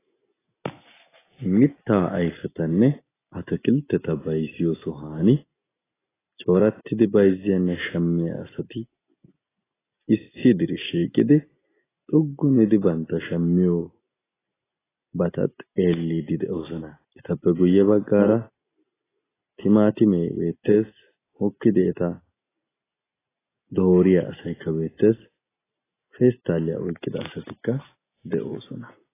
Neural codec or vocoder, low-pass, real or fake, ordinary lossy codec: none; 3.6 kHz; real; AAC, 16 kbps